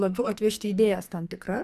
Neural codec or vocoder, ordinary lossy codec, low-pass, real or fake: codec, 44.1 kHz, 2.6 kbps, SNAC; Opus, 64 kbps; 14.4 kHz; fake